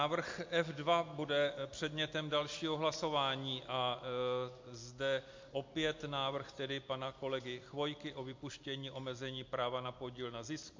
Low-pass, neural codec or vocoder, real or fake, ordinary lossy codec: 7.2 kHz; none; real; MP3, 48 kbps